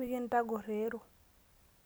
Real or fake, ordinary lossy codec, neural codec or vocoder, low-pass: real; none; none; none